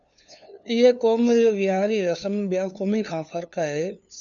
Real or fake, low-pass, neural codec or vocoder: fake; 7.2 kHz; codec, 16 kHz, 2 kbps, FunCodec, trained on LibriTTS, 25 frames a second